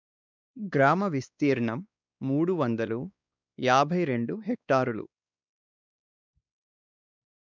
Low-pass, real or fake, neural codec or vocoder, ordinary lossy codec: 7.2 kHz; fake; codec, 16 kHz, 2 kbps, X-Codec, WavLM features, trained on Multilingual LibriSpeech; none